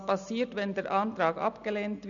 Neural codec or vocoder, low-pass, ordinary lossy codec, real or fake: none; 7.2 kHz; none; real